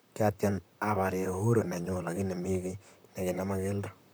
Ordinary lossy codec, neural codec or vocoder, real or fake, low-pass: none; vocoder, 44.1 kHz, 128 mel bands, Pupu-Vocoder; fake; none